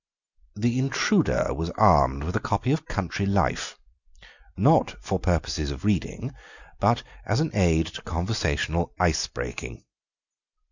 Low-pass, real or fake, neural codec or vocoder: 7.2 kHz; real; none